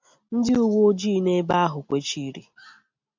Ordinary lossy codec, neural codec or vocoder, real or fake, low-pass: MP3, 64 kbps; none; real; 7.2 kHz